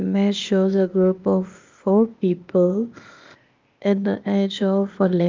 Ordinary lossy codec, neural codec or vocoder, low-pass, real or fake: Opus, 24 kbps; codec, 16 kHz, 0.8 kbps, ZipCodec; 7.2 kHz; fake